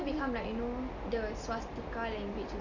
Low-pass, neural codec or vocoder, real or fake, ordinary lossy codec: 7.2 kHz; none; real; none